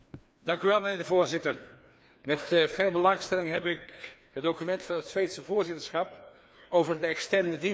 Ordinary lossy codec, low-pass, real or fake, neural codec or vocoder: none; none; fake; codec, 16 kHz, 2 kbps, FreqCodec, larger model